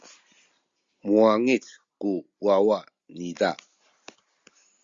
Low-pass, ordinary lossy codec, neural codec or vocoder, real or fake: 7.2 kHz; Opus, 64 kbps; none; real